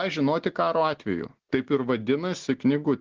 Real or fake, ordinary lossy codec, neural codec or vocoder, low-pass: real; Opus, 16 kbps; none; 7.2 kHz